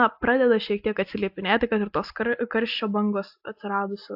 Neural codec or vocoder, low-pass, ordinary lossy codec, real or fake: none; 5.4 kHz; AAC, 48 kbps; real